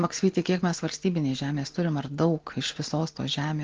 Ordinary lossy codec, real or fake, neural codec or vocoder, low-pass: Opus, 16 kbps; real; none; 7.2 kHz